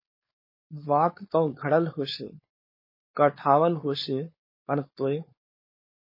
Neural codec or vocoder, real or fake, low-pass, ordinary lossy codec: codec, 16 kHz, 4.8 kbps, FACodec; fake; 5.4 kHz; MP3, 24 kbps